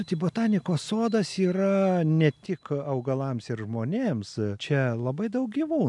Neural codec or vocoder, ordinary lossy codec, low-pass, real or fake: none; MP3, 96 kbps; 10.8 kHz; real